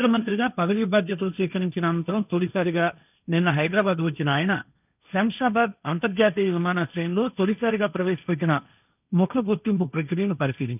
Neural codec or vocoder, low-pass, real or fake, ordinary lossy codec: codec, 16 kHz, 1.1 kbps, Voila-Tokenizer; 3.6 kHz; fake; none